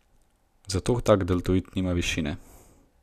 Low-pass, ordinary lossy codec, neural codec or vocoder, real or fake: 14.4 kHz; none; none; real